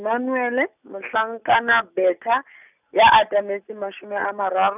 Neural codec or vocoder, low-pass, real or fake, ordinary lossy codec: none; 3.6 kHz; real; none